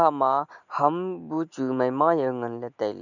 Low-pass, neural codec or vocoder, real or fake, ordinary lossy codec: 7.2 kHz; none; real; none